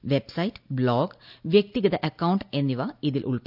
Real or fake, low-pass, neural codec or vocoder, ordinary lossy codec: real; 5.4 kHz; none; none